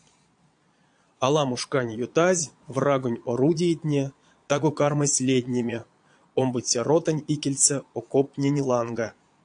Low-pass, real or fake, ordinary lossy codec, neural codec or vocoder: 9.9 kHz; fake; AAC, 64 kbps; vocoder, 22.05 kHz, 80 mel bands, Vocos